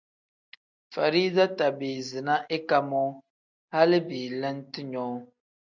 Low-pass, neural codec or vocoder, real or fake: 7.2 kHz; none; real